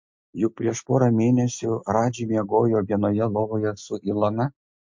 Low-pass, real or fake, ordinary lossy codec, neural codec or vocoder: 7.2 kHz; real; MP3, 48 kbps; none